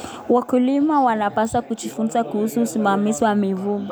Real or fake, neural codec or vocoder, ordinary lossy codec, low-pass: real; none; none; none